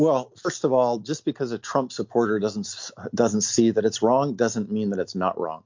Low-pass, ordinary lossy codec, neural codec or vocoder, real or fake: 7.2 kHz; MP3, 48 kbps; none; real